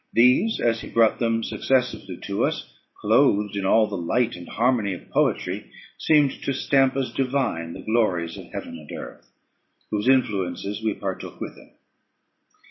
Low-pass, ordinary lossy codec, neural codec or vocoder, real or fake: 7.2 kHz; MP3, 24 kbps; none; real